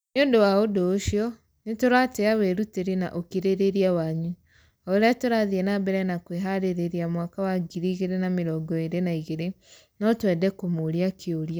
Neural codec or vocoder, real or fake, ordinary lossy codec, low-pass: none; real; none; none